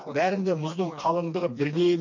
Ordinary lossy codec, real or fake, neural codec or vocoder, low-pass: MP3, 48 kbps; fake; codec, 16 kHz, 2 kbps, FreqCodec, smaller model; 7.2 kHz